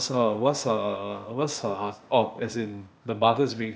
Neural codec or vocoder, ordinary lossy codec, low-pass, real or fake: codec, 16 kHz, 0.8 kbps, ZipCodec; none; none; fake